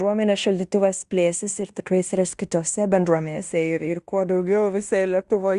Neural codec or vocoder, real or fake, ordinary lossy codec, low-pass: codec, 16 kHz in and 24 kHz out, 0.9 kbps, LongCat-Audio-Codec, fine tuned four codebook decoder; fake; Opus, 64 kbps; 10.8 kHz